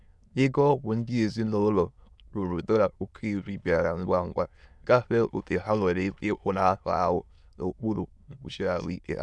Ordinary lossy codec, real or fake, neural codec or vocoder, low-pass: none; fake; autoencoder, 22.05 kHz, a latent of 192 numbers a frame, VITS, trained on many speakers; none